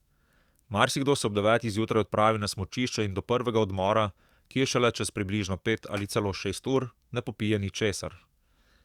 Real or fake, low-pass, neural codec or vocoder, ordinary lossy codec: fake; 19.8 kHz; codec, 44.1 kHz, 7.8 kbps, DAC; Opus, 64 kbps